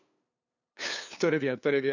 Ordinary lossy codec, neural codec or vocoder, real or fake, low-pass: none; codec, 16 kHz, 2 kbps, FunCodec, trained on LibriTTS, 25 frames a second; fake; 7.2 kHz